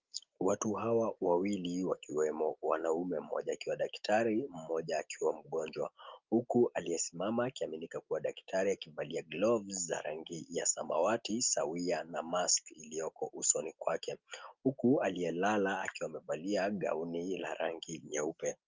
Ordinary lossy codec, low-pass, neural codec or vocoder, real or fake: Opus, 32 kbps; 7.2 kHz; none; real